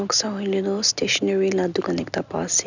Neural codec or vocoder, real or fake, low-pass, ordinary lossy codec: none; real; 7.2 kHz; none